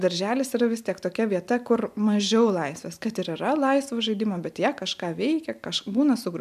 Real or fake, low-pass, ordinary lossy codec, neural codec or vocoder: real; 14.4 kHz; MP3, 96 kbps; none